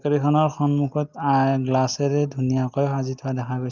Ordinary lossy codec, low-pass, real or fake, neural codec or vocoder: Opus, 32 kbps; 7.2 kHz; real; none